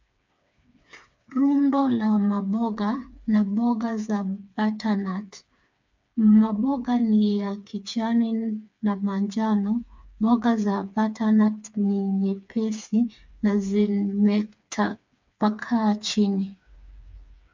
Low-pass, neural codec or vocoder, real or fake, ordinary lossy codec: 7.2 kHz; codec, 16 kHz, 4 kbps, FreqCodec, smaller model; fake; MP3, 64 kbps